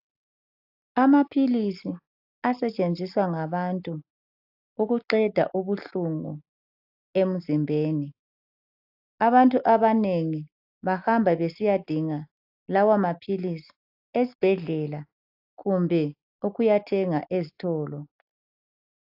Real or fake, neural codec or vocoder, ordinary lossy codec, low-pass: real; none; AAC, 48 kbps; 5.4 kHz